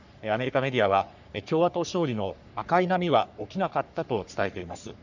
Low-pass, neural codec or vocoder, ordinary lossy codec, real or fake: 7.2 kHz; codec, 44.1 kHz, 3.4 kbps, Pupu-Codec; none; fake